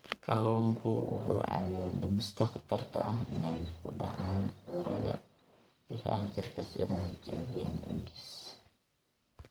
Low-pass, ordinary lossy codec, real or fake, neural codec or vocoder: none; none; fake; codec, 44.1 kHz, 1.7 kbps, Pupu-Codec